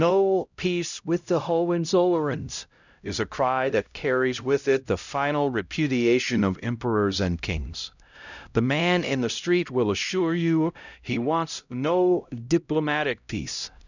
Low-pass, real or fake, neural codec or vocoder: 7.2 kHz; fake; codec, 16 kHz, 0.5 kbps, X-Codec, HuBERT features, trained on LibriSpeech